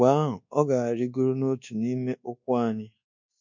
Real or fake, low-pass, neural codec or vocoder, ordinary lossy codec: fake; 7.2 kHz; codec, 24 kHz, 1.2 kbps, DualCodec; MP3, 48 kbps